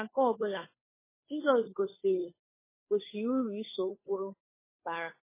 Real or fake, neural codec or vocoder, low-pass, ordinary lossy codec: fake; codec, 16 kHz, 2 kbps, FunCodec, trained on Chinese and English, 25 frames a second; 3.6 kHz; MP3, 16 kbps